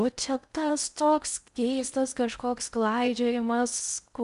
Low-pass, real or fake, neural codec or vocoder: 10.8 kHz; fake; codec, 16 kHz in and 24 kHz out, 0.6 kbps, FocalCodec, streaming, 2048 codes